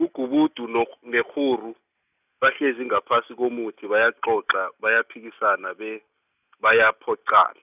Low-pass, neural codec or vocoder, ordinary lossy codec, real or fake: 3.6 kHz; none; none; real